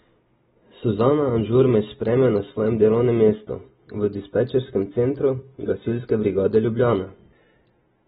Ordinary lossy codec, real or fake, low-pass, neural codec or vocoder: AAC, 16 kbps; real; 7.2 kHz; none